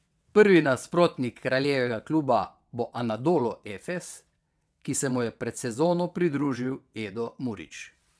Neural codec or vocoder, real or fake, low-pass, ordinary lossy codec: vocoder, 22.05 kHz, 80 mel bands, WaveNeXt; fake; none; none